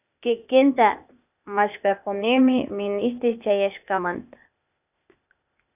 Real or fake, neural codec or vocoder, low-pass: fake; codec, 16 kHz, 0.8 kbps, ZipCodec; 3.6 kHz